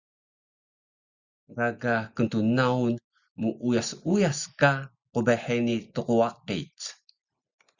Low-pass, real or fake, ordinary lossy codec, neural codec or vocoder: 7.2 kHz; real; Opus, 64 kbps; none